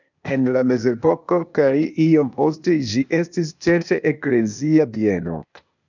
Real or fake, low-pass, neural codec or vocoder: fake; 7.2 kHz; codec, 16 kHz, 0.8 kbps, ZipCodec